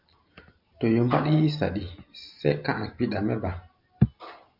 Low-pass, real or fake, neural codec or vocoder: 5.4 kHz; real; none